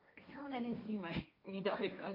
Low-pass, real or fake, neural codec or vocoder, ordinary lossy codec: 5.4 kHz; fake; codec, 16 kHz, 1.1 kbps, Voila-Tokenizer; MP3, 32 kbps